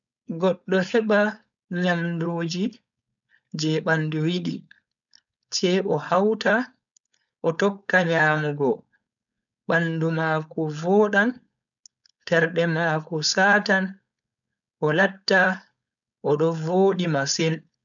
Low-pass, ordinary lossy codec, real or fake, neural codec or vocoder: 7.2 kHz; none; fake; codec, 16 kHz, 4.8 kbps, FACodec